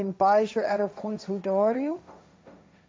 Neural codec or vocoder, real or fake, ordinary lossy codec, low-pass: codec, 16 kHz, 1.1 kbps, Voila-Tokenizer; fake; none; none